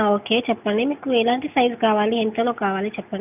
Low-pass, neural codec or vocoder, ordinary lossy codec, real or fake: 3.6 kHz; none; none; real